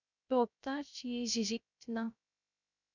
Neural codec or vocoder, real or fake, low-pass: codec, 16 kHz, 0.3 kbps, FocalCodec; fake; 7.2 kHz